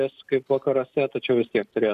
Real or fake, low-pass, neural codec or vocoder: real; 14.4 kHz; none